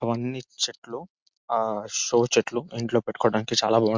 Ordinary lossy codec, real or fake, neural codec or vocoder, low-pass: MP3, 64 kbps; real; none; 7.2 kHz